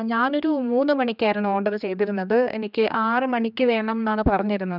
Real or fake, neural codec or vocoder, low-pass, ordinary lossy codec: fake; codec, 16 kHz, 2 kbps, X-Codec, HuBERT features, trained on general audio; 5.4 kHz; none